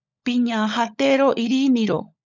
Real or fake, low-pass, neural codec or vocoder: fake; 7.2 kHz; codec, 16 kHz, 16 kbps, FunCodec, trained on LibriTTS, 50 frames a second